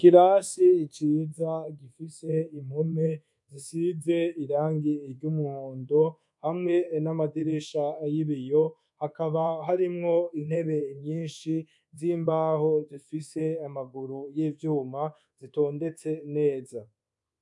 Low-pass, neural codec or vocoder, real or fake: 10.8 kHz; codec, 24 kHz, 1.2 kbps, DualCodec; fake